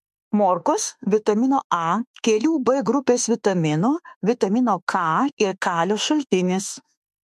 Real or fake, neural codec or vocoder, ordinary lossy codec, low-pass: fake; autoencoder, 48 kHz, 32 numbers a frame, DAC-VAE, trained on Japanese speech; MP3, 64 kbps; 14.4 kHz